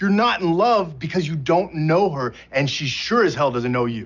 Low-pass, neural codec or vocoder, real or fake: 7.2 kHz; none; real